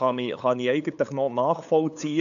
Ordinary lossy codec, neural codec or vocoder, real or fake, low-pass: none; codec, 16 kHz, 8 kbps, FunCodec, trained on LibriTTS, 25 frames a second; fake; 7.2 kHz